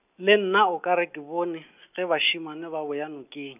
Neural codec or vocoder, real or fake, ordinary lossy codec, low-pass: none; real; none; 3.6 kHz